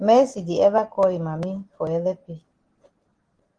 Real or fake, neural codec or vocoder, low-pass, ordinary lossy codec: real; none; 9.9 kHz; Opus, 16 kbps